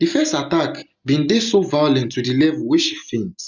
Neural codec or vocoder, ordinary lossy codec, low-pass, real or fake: none; none; 7.2 kHz; real